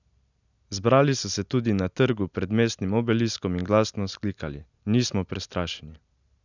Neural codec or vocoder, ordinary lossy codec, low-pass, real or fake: none; none; 7.2 kHz; real